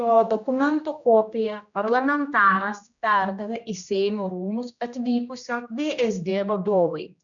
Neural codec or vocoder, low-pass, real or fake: codec, 16 kHz, 1 kbps, X-Codec, HuBERT features, trained on general audio; 7.2 kHz; fake